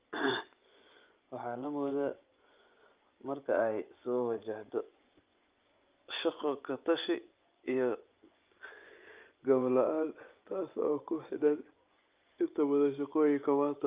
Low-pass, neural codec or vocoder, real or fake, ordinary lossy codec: 3.6 kHz; vocoder, 24 kHz, 100 mel bands, Vocos; fake; Opus, 24 kbps